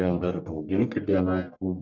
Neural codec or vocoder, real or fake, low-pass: codec, 44.1 kHz, 1.7 kbps, Pupu-Codec; fake; 7.2 kHz